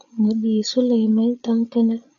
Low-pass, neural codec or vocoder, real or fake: 7.2 kHz; codec, 16 kHz, 8 kbps, FreqCodec, larger model; fake